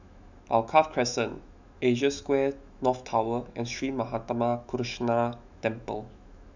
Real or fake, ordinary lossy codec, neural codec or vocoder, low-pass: fake; none; autoencoder, 48 kHz, 128 numbers a frame, DAC-VAE, trained on Japanese speech; 7.2 kHz